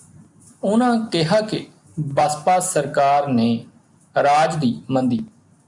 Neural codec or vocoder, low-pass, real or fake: none; 10.8 kHz; real